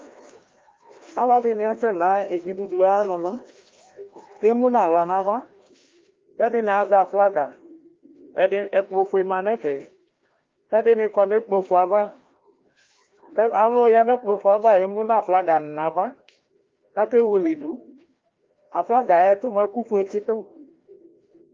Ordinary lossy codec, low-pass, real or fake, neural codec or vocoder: Opus, 32 kbps; 7.2 kHz; fake; codec, 16 kHz, 1 kbps, FreqCodec, larger model